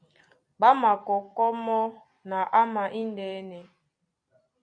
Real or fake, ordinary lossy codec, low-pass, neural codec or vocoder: fake; AAC, 64 kbps; 9.9 kHz; vocoder, 44.1 kHz, 128 mel bands every 256 samples, BigVGAN v2